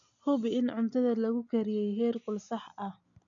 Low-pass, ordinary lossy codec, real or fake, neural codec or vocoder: 7.2 kHz; none; real; none